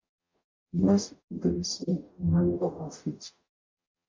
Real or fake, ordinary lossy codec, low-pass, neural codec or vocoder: fake; MP3, 48 kbps; 7.2 kHz; codec, 44.1 kHz, 0.9 kbps, DAC